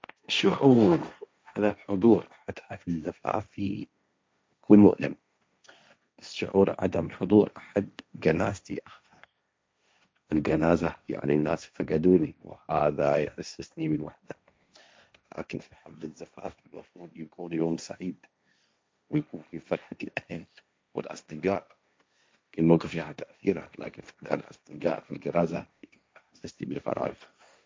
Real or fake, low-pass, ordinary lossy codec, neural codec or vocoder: fake; none; none; codec, 16 kHz, 1.1 kbps, Voila-Tokenizer